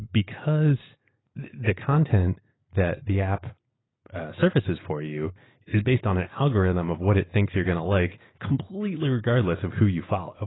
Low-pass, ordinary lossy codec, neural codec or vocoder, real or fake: 7.2 kHz; AAC, 16 kbps; none; real